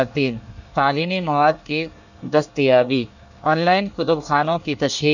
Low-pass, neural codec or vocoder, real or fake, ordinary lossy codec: 7.2 kHz; codec, 24 kHz, 1 kbps, SNAC; fake; none